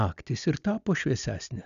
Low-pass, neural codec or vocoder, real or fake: 7.2 kHz; none; real